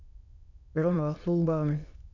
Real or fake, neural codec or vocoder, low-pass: fake; autoencoder, 22.05 kHz, a latent of 192 numbers a frame, VITS, trained on many speakers; 7.2 kHz